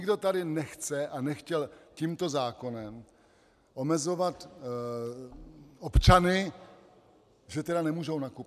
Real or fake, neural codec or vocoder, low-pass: real; none; 14.4 kHz